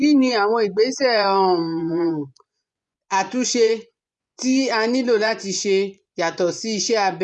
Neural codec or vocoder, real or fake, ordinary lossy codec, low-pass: vocoder, 24 kHz, 100 mel bands, Vocos; fake; none; 10.8 kHz